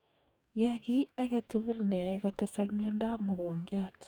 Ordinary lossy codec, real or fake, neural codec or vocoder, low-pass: none; fake; codec, 44.1 kHz, 2.6 kbps, DAC; 19.8 kHz